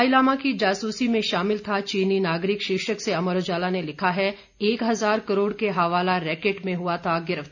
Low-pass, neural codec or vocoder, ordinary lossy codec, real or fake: 7.2 kHz; none; none; real